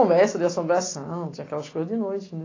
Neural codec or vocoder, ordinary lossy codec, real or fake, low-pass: none; AAC, 32 kbps; real; 7.2 kHz